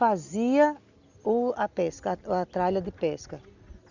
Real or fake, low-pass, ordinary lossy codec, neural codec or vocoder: real; 7.2 kHz; Opus, 64 kbps; none